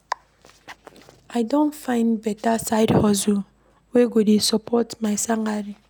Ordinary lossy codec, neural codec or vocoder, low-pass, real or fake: none; none; none; real